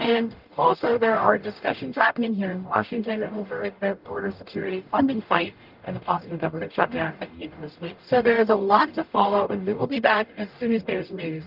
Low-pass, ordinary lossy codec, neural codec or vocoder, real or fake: 5.4 kHz; Opus, 24 kbps; codec, 44.1 kHz, 0.9 kbps, DAC; fake